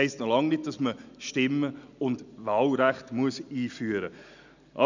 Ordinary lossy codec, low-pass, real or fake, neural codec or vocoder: none; 7.2 kHz; real; none